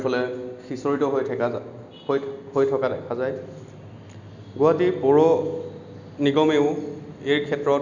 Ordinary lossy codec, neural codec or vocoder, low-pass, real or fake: none; none; 7.2 kHz; real